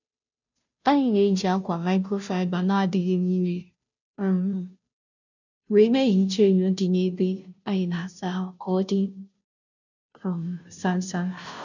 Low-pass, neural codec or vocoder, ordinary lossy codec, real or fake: 7.2 kHz; codec, 16 kHz, 0.5 kbps, FunCodec, trained on Chinese and English, 25 frames a second; none; fake